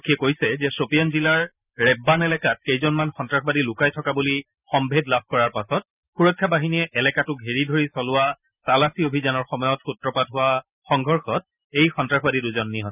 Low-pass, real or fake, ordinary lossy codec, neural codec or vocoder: 3.6 kHz; real; none; none